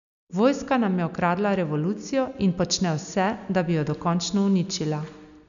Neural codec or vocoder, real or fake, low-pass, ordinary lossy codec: none; real; 7.2 kHz; none